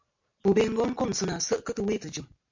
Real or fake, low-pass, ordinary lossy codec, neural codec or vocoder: real; 7.2 kHz; MP3, 48 kbps; none